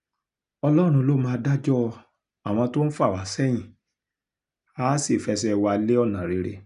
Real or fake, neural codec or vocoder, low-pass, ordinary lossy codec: real; none; 10.8 kHz; none